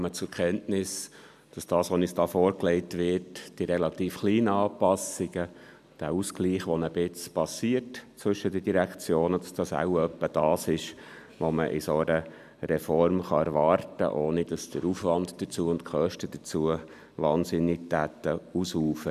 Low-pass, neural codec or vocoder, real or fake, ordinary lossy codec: 14.4 kHz; none; real; none